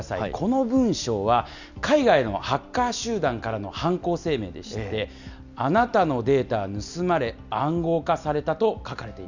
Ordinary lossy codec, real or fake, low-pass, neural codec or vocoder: none; real; 7.2 kHz; none